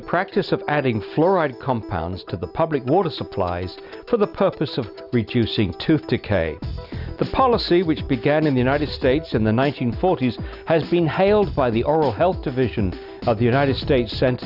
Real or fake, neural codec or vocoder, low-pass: real; none; 5.4 kHz